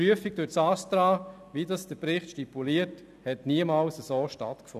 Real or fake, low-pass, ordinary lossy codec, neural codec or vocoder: real; 14.4 kHz; none; none